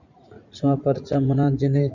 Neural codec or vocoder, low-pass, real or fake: vocoder, 44.1 kHz, 80 mel bands, Vocos; 7.2 kHz; fake